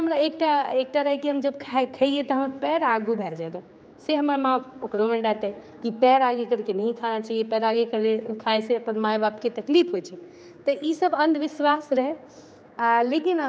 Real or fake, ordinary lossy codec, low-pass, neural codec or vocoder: fake; none; none; codec, 16 kHz, 4 kbps, X-Codec, HuBERT features, trained on general audio